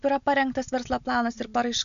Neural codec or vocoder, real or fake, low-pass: none; real; 7.2 kHz